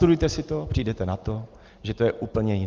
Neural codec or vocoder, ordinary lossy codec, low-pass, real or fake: none; Opus, 32 kbps; 7.2 kHz; real